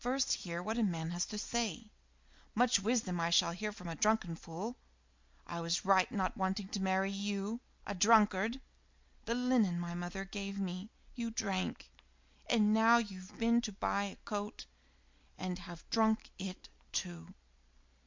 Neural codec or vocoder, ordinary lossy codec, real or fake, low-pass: none; MP3, 64 kbps; real; 7.2 kHz